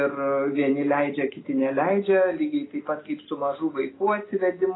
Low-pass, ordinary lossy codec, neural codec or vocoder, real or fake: 7.2 kHz; AAC, 16 kbps; none; real